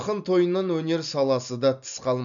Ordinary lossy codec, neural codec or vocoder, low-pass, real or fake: none; none; 7.2 kHz; real